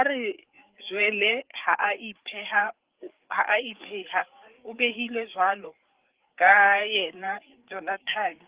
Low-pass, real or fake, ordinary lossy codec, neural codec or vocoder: 3.6 kHz; fake; Opus, 16 kbps; codec, 16 kHz, 4 kbps, FreqCodec, larger model